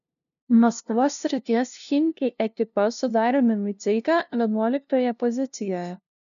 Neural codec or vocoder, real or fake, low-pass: codec, 16 kHz, 0.5 kbps, FunCodec, trained on LibriTTS, 25 frames a second; fake; 7.2 kHz